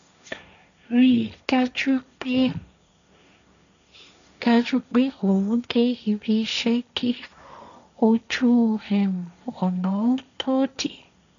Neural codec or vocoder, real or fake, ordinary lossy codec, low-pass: codec, 16 kHz, 1.1 kbps, Voila-Tokenizer; fake; none; 7.2 kHz